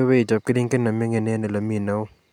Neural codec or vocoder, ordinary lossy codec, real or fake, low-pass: none; none; real; 19.8 kHz